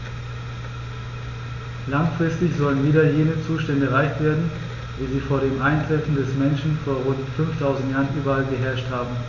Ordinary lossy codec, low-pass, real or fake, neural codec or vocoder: none; 7.2 kHz; real; none